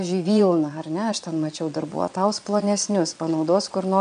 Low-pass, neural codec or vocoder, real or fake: 9.9 kHz; vocoder, 22.05 kHz, 80 mel bands, Vocos; fake